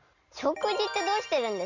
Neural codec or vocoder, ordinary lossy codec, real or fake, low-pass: none; none; real; 7.2 kHz